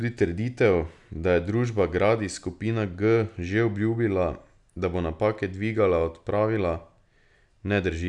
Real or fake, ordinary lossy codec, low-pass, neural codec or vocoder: real; none; 10.8 kHz; none